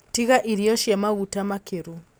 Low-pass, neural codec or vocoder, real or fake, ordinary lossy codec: none; none; real; none